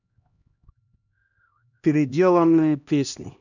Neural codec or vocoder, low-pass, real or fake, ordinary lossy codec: codec, 16 kHz, 1 kbps, X-Codec, HuBERT features, trained on LibriSpeech; 7.2 kHz; fake; none